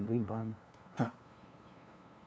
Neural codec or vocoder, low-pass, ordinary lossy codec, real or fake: codec, 16 kHz, 2 kbps, FunCodec, trained on LibriTTS, 25 frames a second; none; none; fake